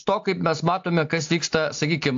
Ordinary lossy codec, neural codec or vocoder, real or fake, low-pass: AAC, 64 kbps; none; real; 7.2 kHz